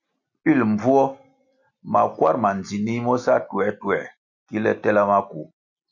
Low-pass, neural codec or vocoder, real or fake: 7.2 kHz; none; real